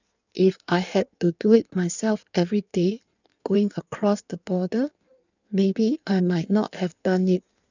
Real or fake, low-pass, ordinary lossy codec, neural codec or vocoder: fake; 7.2 kHz; none; codec, 16 kHz in and 24 kHz out, 1.1 kbps, FireRedTTS-2 codec